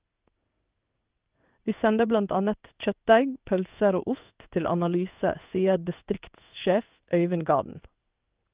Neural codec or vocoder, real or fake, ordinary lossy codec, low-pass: codec, 16 kHz in and 24 kHz out, 1 kbps, XY-Tokenizer; fake; Opus, 32 kbps; 3.6 kHz